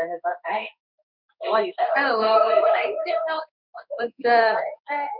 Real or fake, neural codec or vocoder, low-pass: fake; codec, 16 kHz in and 24 kHz out, 1 kbps, XY-Tokenizer; 5.4 kHz